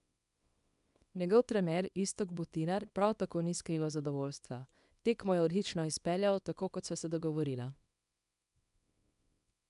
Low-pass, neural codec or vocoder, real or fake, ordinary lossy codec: 10.8 kHz; codec, 24 kHz, 0.9 kbps, WavTokenizer, small release; fake; none